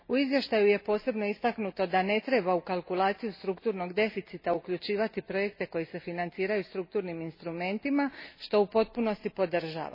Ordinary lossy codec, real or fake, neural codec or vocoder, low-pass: MP3, 24 kbps; real; none; 5.4 kHz